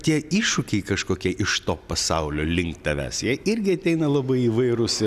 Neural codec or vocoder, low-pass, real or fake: none; 14.4 kHz; real